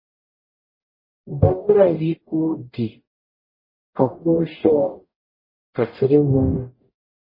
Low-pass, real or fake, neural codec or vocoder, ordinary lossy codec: 5.4 kHz; fake; codec, 44.1 kHz, 0.9 kbps, DAC; MP3, 24 kbps